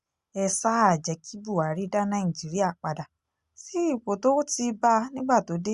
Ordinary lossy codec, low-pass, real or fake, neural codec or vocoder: Opus, 64 kbps; 10.8 kHz; real; none